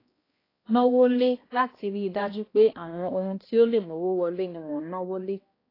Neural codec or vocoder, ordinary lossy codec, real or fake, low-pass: codec, 16 kHz, 1 kbps, X-Codec, HuBERT features, trained on balanced general audio; AAC, 24 kbps; fake; 5.4 kHz